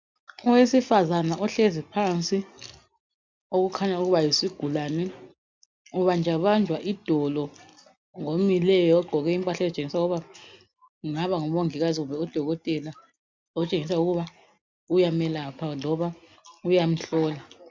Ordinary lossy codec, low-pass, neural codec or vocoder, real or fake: MP3, 64 kbps; 7.2 kHz; none; real